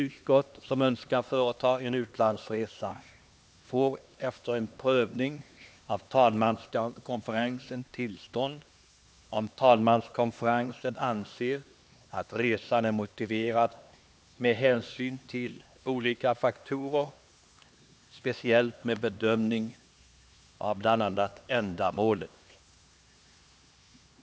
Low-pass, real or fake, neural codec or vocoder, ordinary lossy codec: none; fake; codec, 16 kHz, 2 kbps, X-Codec, HuBERT features, trained on LibriSpeech; none